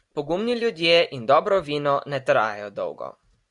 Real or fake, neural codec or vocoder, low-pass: real; none; 10.8 kHz